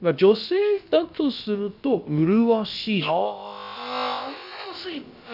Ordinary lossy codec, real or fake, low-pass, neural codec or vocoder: none; fake; 5.4 kHz; codec, 16 kHz, about 1 kbps, DyCAST, with the encoder's durations